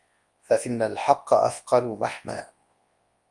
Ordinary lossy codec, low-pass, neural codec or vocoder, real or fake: Opus, 32 kbps; 10.8 kHz; codec, 24 kHz, 0.9 kbps, WavTokenizer, large speech release; fake